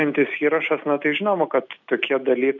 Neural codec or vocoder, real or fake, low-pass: none; real; 7.2 kHz